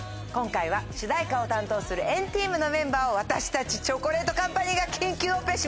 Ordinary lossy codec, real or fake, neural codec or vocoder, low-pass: none; real; none; none